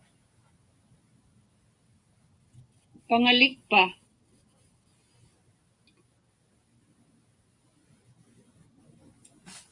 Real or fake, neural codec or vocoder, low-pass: real; none; 10.8 kHz